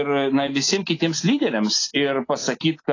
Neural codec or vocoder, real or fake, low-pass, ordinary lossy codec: none; real; 7.2 kHz; AAC, 32 kbps